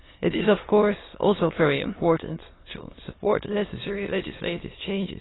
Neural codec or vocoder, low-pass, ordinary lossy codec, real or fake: autoencoder, 22.05 kHz, a latent of 192 numbers a frame, VITS, trained on many speakers; 7.2 kHz; AAC, 16 kbps; fake